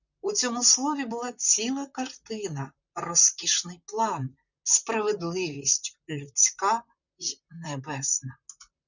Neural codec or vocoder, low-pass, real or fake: vocoder, 44.1 kHz, 128 mel bands, Pupu-Vocoder; 7.2 kHz; fake